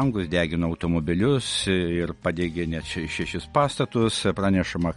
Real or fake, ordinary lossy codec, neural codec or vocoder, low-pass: real; MP3, 48 kbps; none; 10.8 kHz